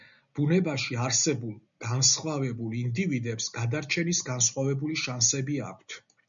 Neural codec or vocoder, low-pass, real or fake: none; 7.2 kHz; real